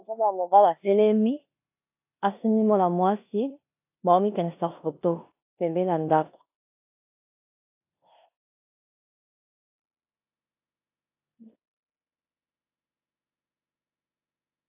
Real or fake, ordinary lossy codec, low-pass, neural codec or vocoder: fake; AAC, 32 kbps; 3.6 kHz; codec, 16 kHz in and 24 kHz out, 0.9 kbps, LongCat-Audio-Codec, four codebook decoder